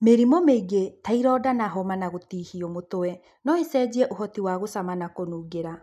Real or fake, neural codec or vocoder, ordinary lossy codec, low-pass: real; none; none; 14.4 kHz